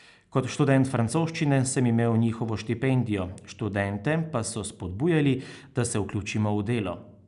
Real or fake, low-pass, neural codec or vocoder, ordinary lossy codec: real; 10.8 kHz; none; none